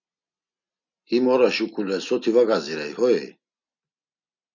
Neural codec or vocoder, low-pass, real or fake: none; 7.2 kHz; real